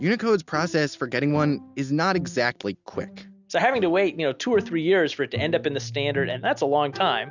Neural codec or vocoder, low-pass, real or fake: none; 7.2 kHz; real